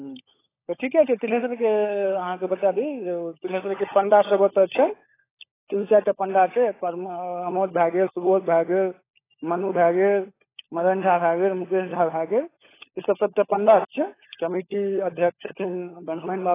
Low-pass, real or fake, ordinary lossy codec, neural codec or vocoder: 3.6 kHz; fake; AAC, 16 kbps; codec, 16 kHz, 16 kbps, FunCodec, trained on LibriTTS, 50 frames a second